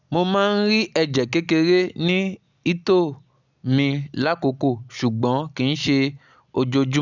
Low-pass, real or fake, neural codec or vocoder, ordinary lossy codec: 7.2 kHz; real; none; none